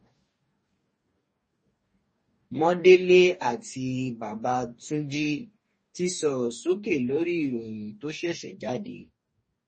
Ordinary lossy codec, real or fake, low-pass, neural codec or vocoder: MP3, 32 kbps; fake; 10.8 kHz; codec, 44.1 kHz, 2.6 kbps, DAC